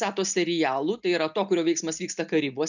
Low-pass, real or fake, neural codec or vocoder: 7.2 kHz; real; none